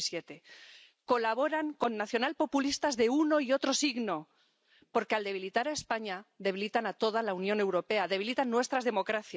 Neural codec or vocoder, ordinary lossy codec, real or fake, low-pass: none; none; real; none